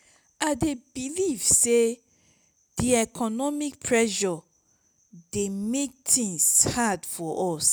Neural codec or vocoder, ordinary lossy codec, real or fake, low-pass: none; none; real; none